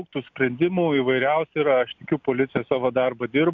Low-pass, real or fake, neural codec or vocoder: 7.2 kHz; real; none